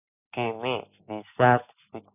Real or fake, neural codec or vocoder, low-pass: real; none; 3.6 kHz